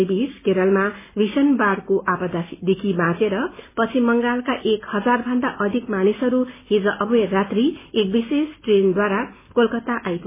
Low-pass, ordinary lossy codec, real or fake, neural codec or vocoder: 3.6 kHz; MP3, 16 kbps; real; none